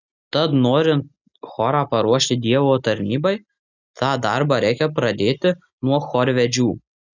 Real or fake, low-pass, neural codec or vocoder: real; 7.2 kHz; none